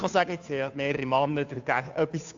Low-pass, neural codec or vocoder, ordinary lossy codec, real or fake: 7.2 kHz; codec, 16 kHz, 2 kbps, FunCodec, trained on Chinese and English, 25 frames a second; AAC, 64 kbps; fake